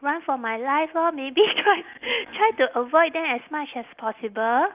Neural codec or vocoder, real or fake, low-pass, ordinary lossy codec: none; real; 3.6 kHz; Opus, 24 kbps